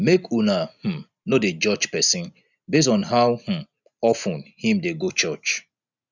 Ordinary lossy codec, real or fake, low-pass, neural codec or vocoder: none; real; 7.2 kHz; none